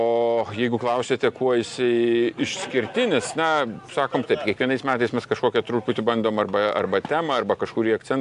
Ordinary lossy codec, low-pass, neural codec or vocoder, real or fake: AAC, 64 kbps; 10.8 kHz; none; real